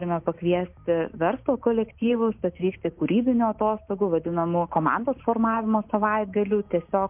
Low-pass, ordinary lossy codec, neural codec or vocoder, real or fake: 3.6 kHz; MP3, 32 kbps; none; real